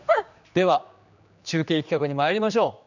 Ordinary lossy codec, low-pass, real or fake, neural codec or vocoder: none; 7.2 kHz; fake; codec, 16 kHz, 2 kbps, X-Codec, HuBERT features, trained on general audio